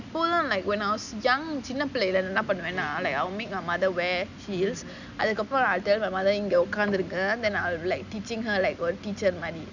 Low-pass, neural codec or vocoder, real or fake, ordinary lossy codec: 7.2 kHz; none; real; none